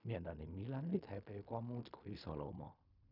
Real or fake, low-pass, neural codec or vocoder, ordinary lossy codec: fake; 5.4 kHz; codec, 16 kHz in and 24 kHz out, 0.4 kbps, LongCat-Audio-Codec, fine tuned four codebook decoder; none